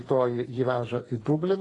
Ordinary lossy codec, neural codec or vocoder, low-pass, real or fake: AAC, 32 kbps; codec, 44.1 kHz, 2.6 kbps, SNAC; 10.8 kHz; fake